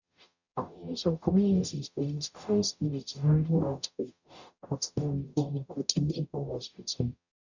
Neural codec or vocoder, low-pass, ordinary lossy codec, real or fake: codec, 44.1 kHz, 0.9 kbps, DAC; 7.2 kHz; none; fake